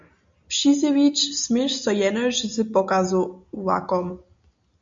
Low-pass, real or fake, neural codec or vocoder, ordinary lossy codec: 7.2 kHz; real; none; MP3, 48 kbps